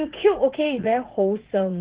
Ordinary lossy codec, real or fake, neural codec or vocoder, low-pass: Opus, 16 kbps; fake; vocoder, 44.1 kHz, 80 mel bands, Vocos; 3.6 kHz